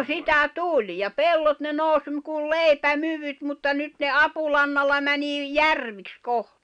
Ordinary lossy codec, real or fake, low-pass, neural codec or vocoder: none; real; 9.9 kHz; none